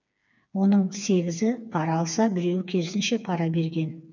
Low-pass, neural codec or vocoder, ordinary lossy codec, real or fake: 7.2 kHz; codec, 16 kHz, 4 kbps, FreqCodec, smaller model; none; fake